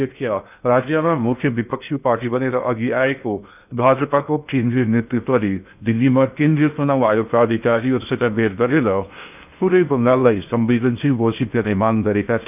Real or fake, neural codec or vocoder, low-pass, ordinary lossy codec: fake; codec, 16 kHz in and 24 kHz out, 0.6 kbps, FocalCodec, streaming, 2048 codes; 3.6 kHz; none